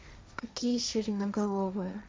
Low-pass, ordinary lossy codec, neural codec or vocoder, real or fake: none; none; codec, 16 kHz, 1.1 kbps, Voila-Tokenizer; fake